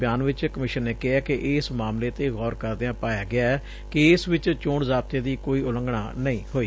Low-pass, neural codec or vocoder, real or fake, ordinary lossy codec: none; none; real; none